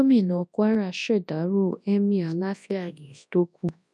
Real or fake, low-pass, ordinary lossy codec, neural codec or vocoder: fake; none; none; codec, 24 kHz, 0.9 kbps, WavTokenizer, large speech release